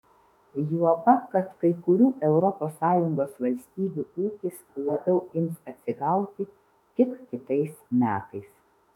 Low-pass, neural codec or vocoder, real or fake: 19.8 kHz; autoencoder, 48 kHz, 32 numbers a frame, DAC-VAE, trained on Japanese speech; fake